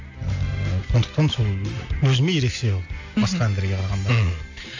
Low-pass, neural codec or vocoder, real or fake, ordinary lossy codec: 7.2 kHz; none; real; AAC, 48 kbps